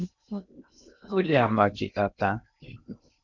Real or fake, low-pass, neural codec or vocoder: fake; 7.2 kHz; codec, 16 kHz in and 24 kHz out, 0.6 kbps, FocalCodec, streaming, 2048 codes